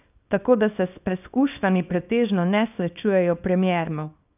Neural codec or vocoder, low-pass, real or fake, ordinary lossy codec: codec, 24 kHz, 0.9 kbps, WavTokenizer, small release; 3.6 kHz; fake; none